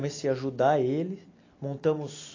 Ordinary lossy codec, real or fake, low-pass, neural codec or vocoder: AAC, 32 kbps; real; 7.2 kHz; none